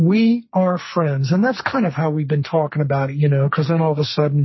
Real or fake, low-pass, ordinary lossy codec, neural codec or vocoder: fake; 7.2 kHz; MP3, 24 kbps; codec, 44.1 kHz, 2.6 kbps, SNAC